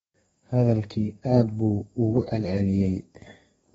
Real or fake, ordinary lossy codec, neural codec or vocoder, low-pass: fake; AAC, 24 kbps; codec, 32 kHz, 1.9 kbps, SNAC; 14.4 kHz